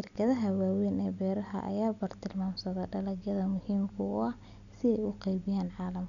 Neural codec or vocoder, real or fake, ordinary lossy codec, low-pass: none; real; none; 7.2 kHz